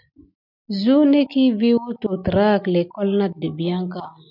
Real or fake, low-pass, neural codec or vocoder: real; 5.4 kHz; none